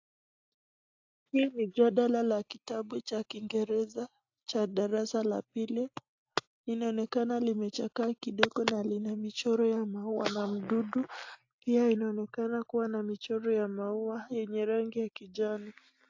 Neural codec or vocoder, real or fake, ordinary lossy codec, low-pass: none; real; AAC, 48 kbps; 7.2 kHz